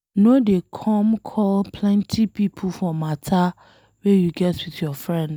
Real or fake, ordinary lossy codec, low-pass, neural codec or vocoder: real; none; none; none